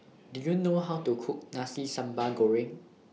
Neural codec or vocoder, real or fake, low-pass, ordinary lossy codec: none; real; none; none